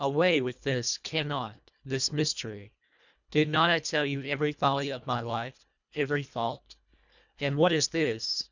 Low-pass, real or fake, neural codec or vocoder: 7.2 kHz; fake; codec, 24 kHz, 1.5 kbps, HILCodec